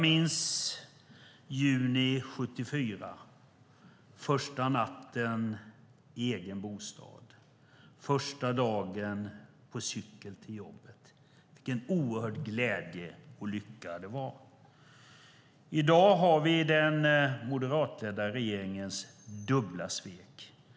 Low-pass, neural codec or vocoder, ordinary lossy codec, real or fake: none; none; none; real